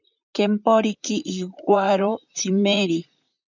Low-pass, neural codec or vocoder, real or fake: 7.2 kHz; vocoder, 44.1 kHz, 128 mel bands, Pupu-Vocoder; fake